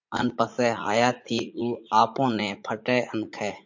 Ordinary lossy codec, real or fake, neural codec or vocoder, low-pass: MP3, 48 kbps; fake; vocoder, 44.1 kHz, 80 mel bands, Vocos; 7.2 kHz